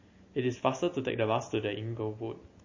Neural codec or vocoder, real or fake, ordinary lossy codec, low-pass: none; real; MP3, 32 kbps; 7.2 kHz